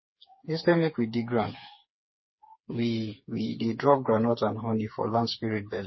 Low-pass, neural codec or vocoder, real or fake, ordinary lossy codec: 7.2 kHz; codec, 16 kHz, 4 kbps, FreqCodec, smaller model; fake; MP3, 24 kbps